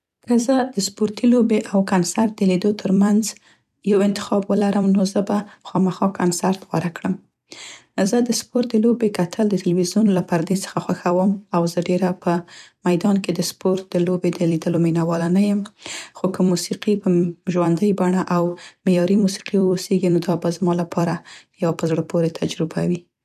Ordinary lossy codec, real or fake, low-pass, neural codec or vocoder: none; fake; 14.4 kHz; vocoder, 44.1 kHz, 128 mel bands every 256 samples, BigVGAN v2